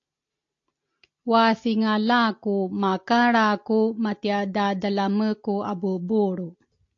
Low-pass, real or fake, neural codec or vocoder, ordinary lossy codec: 7.2 kHz; real; none; AAC, 48 kbps